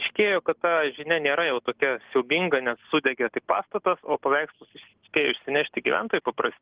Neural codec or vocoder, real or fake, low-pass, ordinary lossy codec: none; real; 3.6 kHz; Opus, 16 kbps